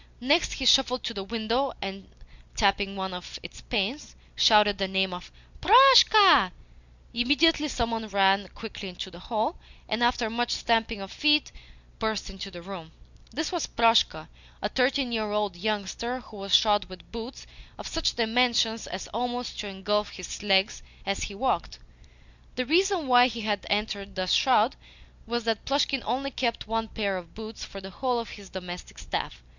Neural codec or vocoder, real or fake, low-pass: none; real; 7.2 kHz